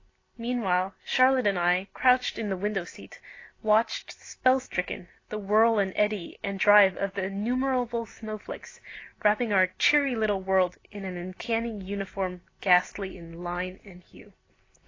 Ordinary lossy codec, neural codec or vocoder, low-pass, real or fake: AAC, 32 kbps; none; 7.2 kHz; real